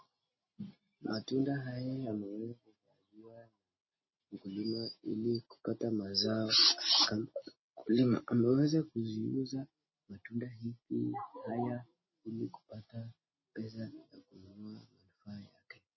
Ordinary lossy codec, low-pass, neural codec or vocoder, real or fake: MP3, 24 kbps; 7.2 kHz; none; real